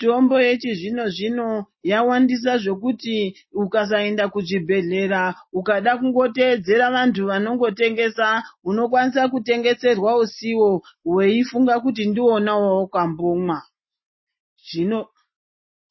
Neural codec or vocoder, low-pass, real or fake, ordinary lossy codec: none; 7.2 kHz; real; MP3, 24 kbps